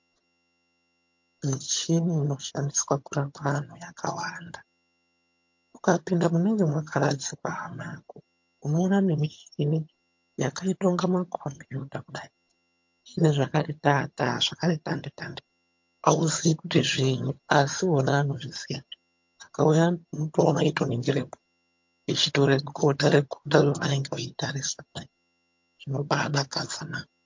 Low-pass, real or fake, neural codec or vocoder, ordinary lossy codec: 7.2 kHz; fake; vocoder, 22.05 kHz, 80 mel bands, HiFi-GAN; MP3, 48 kbps